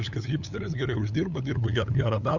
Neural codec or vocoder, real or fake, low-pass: codec, 16 kHz, 8 kbps, FunCodec, trained on LibriTTS, 25 frames a second; fake; 7.2 kHz